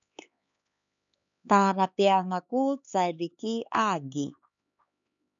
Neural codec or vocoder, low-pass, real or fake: codec, 16 kHz, 4 kbps, X-Codec, HuBERT features, trained on LibriSpeech; 7.2 kHz; fake